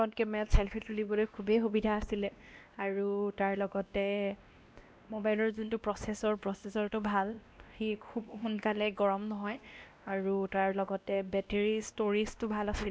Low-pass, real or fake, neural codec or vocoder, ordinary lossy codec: none; fake; codec, 16 kHz, 1 kbps, X-Codec, WavLM features, trained on Multilingual LibriSpeech; none